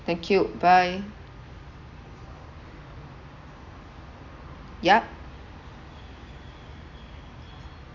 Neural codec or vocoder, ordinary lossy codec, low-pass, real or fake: none; none; 7.2 kHz; real